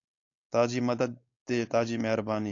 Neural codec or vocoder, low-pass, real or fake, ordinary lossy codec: codec, 16 kHz, 4.8 kbps, FACodec; 7.2 kHz; fake; AAC, 48 kbps